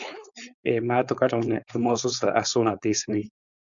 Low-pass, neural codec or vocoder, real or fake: 7.2 kHz; codec, 16 kHz, 4.8 kbps, FACodec; fake